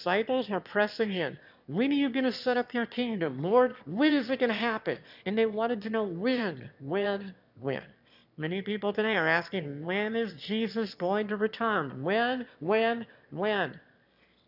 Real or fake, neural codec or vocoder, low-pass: fake; autoencoder, 22.05 kHz, a latent of 192 numbers a frame, VITS, trained on one speaker; 5.4 kHz